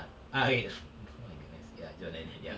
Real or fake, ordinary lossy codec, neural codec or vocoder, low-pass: real; none; none; none